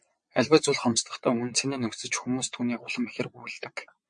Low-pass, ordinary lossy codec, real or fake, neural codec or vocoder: 9.9 kHz; MP3, 48 kbps; fake; vocoder, 22.05 kHz, 80 mel bands, WaveNeXt